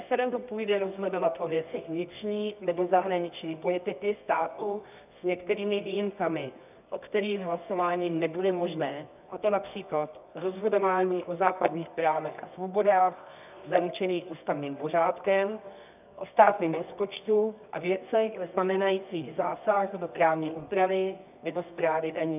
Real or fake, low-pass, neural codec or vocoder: fake; 3.6 kHz; codec, 24 kHz, 0.9 kbps, WavTokenizer, medium music audio release